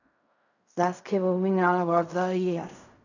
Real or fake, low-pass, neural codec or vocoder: fake; 7.2 kHz; codec, 16 kHz in and 24 kHz out, 0.4 kbps, LongCat-Audio-Codec, fine tuned four codebook decoder